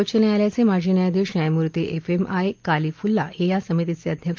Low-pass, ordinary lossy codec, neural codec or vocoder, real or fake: 7.2 kHz; Opus, 24 kbps; none; real